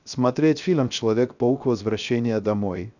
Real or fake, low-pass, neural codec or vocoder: fake; 7.2 kHz; codec, 16 kHz, 0.3 kbps, FocalCodec